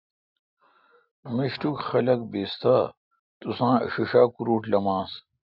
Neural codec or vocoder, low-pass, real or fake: none; 5.4 kHz; real